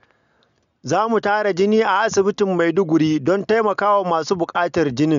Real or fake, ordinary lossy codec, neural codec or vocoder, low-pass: real; none; none; 7.2 kHz